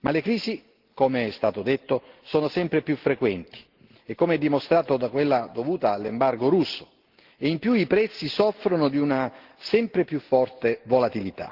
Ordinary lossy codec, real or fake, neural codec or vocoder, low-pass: Opus, 16 kbps; real; none; 5.4 kHz